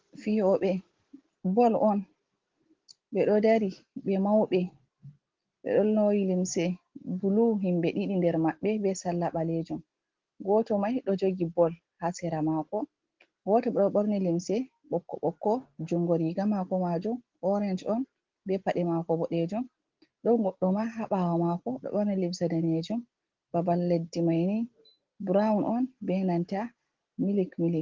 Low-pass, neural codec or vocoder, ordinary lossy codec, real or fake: 7.2 kHz; none; Opus, 16 kbps; real